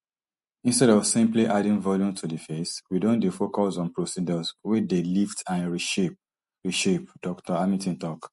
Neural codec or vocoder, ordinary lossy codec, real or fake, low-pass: none; MP3, 48 kbps; real; 14.4 kHz